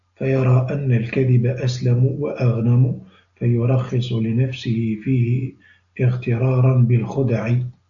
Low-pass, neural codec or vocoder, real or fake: 7.2 kHz; none; real